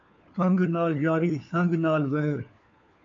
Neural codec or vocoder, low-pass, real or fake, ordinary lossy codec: codec, 16 kHz, 4 kbps, FunCodec, trained on LibriTTS, 50 frames a second; 7.2 kHz; fake; AAC, 48 kbps